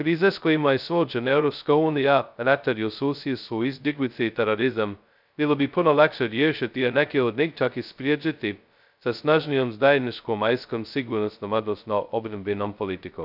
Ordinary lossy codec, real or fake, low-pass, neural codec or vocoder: none; fake; 5.4 kHz; codec, 16 kHz, 0.2 kbps, FocalCodec